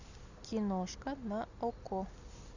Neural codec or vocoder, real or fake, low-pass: none; real; 7.2 kHz